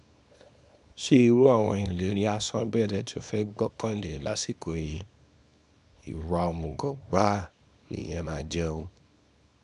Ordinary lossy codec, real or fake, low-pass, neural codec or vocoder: none; fake; 10.8 kHz; codec, 24 kHz, 0.9 kbps, WavTokenizer, small release